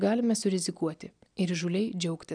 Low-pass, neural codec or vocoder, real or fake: 9.9 kHz; none; real